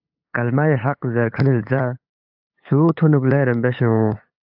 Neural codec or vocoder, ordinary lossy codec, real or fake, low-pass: codec, 16 kHz, 8 kbps, FunCodec, trained on LibriTTS, 25 frames a second; AAC, 48 kbps; fake; 5.4 kHz